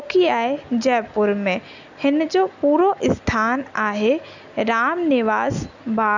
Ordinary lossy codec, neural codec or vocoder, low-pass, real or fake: none; none; 7.2 kHz; real